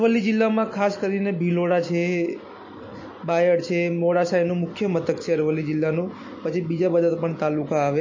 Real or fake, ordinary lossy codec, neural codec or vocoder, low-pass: real; MP3, 32 kbps; none; 7.2 kHz